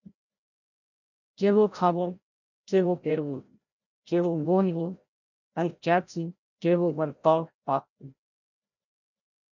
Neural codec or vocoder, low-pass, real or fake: codec, 16 kHz, 0.5 kbps, FreqCodec, larger model; 7.2 kHz; fake